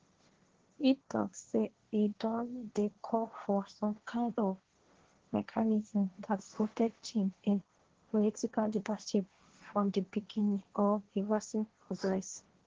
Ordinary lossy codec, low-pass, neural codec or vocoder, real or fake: Opus, 16 kbps; 7.2 kHz; codec, 16 kHz, 1.1 kbps, Voila-Tokenizer; fake